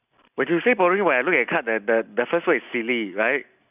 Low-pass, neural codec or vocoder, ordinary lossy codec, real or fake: 3.6 kHz; none; none; real